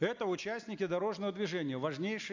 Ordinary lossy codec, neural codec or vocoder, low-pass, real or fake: MP3, 64 kbps; none; 7.2 kHz; real